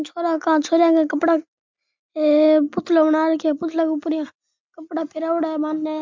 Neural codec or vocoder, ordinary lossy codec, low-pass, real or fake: none; MP3, 64 kbps; 7.2 kHz; real